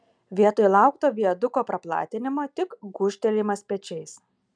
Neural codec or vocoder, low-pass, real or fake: none; 9.9 kHz; real